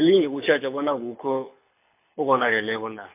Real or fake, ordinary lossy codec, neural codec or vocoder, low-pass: fake; MP3, 32 kbps; codec, 24 kHz, 6 kbps, HILCodec; 3.6 kHz